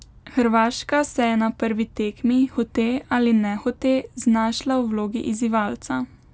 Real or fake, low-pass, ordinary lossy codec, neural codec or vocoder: real; none; none; none